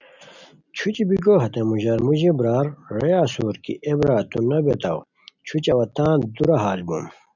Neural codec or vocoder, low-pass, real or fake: none; 7.2 kHz; real